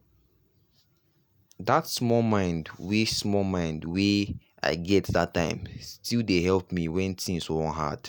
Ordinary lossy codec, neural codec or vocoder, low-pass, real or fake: none; none; none; real